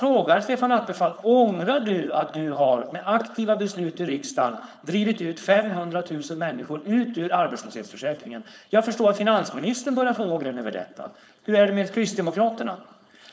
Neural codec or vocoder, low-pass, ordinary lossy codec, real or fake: codec, 16 kHz, 4.8 kbps, FACodec; none; none; fake